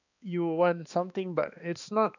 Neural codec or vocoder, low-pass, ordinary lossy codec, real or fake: codec, 16 kHz, 2 kbps, X-Codec, HuBERT features, trained on balanced general audio; 7.2 kHz; none; fake